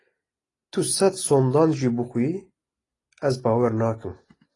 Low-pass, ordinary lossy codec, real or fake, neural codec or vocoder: 10.8 kHz; AAC, 32 kbps; real; none